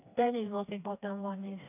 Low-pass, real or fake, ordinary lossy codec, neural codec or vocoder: 3.6 kHz; fake; AAC, 24 kbps; codec, 16 kHz, 2 kbps, FreqCodec, smaller model